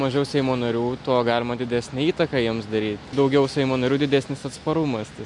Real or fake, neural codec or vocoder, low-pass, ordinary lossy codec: real; none; 10.8 kHz; MP3, 64 kbps